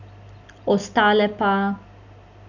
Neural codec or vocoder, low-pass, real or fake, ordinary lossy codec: none; 7.2 kHz; real; none